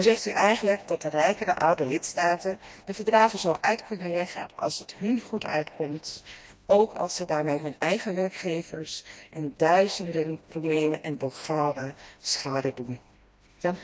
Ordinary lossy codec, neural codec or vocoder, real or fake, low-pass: none; codec, 16 kHz, 1 kbps, FreqCodec, smaller model; fake; none